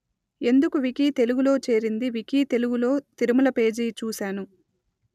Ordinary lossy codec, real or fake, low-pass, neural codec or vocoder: none; real; 14.4 kHz; none